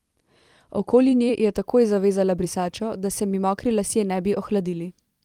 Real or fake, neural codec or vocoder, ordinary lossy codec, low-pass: fake; vocoder, 44.1 kHz, 128 mel bands every 256 samples, BigVGAN v2; Opus, 24 kbps; 19.8 kHz